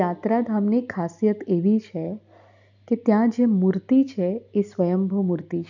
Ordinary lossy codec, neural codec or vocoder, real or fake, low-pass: none; none; real; 7.2 kHz